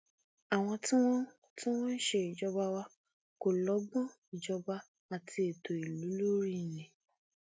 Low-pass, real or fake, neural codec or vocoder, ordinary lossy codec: none; real; none; none